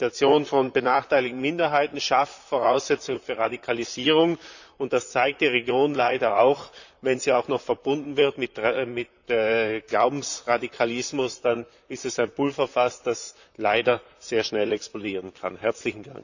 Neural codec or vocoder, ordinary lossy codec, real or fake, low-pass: vocoder, 44.1 kHz, 128 mel bands, Pupu-Vocoder; none; fake; 7.2 kHz